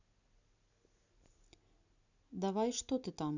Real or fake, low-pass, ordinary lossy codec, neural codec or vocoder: real; 7.2 kHz; none; none